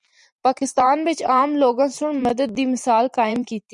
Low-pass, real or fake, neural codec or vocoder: 10.8 kHz; fake; vocoder, 44.1 kHz, 128 mel bands every 512 samples, BigVGAN v2